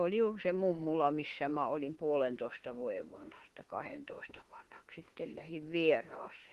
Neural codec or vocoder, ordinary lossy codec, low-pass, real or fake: autoencoder, 48 kHz, 32 numbers a frame, DAC-VAE, trained on Japanese speech; Opus, 16 kbps; 14.4 kHz; fake